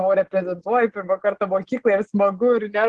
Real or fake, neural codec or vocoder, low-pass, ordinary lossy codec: real; none; 10.8 kHz; Opus, 16 kbps